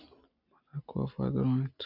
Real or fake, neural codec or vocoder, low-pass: real; none; 5.4 kHz